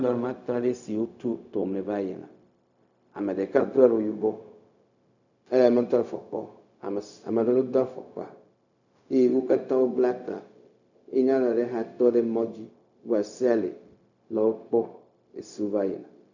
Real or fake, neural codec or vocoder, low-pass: fake; codec, 16 kHz, 0.4 kbps, LongCat-Audio-Codec; 7.2 kHz